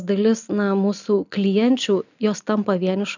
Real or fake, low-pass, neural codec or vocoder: real; 7.2 kHz; none